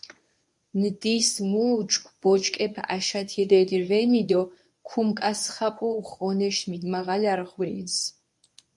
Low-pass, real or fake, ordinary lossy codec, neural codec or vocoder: 10.8 kHz; fake; MP3, 96 kbps; codec, 24 kHz, 0.9 kbps, WavTokenizer, medium speech release version 1